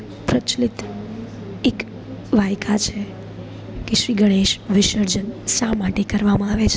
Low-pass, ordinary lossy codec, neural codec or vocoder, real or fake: none; none; none; real